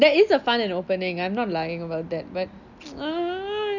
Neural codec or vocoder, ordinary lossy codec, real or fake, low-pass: none; none; real; 7.2 kHz